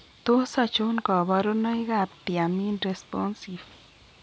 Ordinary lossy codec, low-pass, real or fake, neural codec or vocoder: none; none; real; none